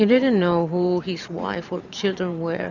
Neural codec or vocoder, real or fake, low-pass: vocoder, 44.1 kHz, 128 mel bands every 512 samples, BigVGAN v2; fake; 7.2 kHz